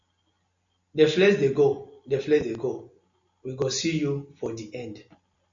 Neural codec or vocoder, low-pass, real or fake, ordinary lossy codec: none; 7.2 kHz; real; MP3, 64 kbps